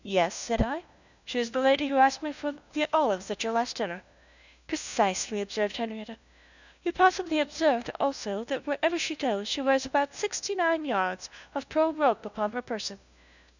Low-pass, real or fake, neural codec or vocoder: 7.2 kHz; fake; codec, 16 kHz, 1 kbps, FunCodec, trained on LibriTTS, 50 frames a second